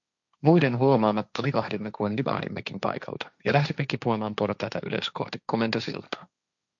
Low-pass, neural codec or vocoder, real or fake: 7.2 kHz; codec, 16 kHz, 1.1 kbps, Voila-Tokenizer; fake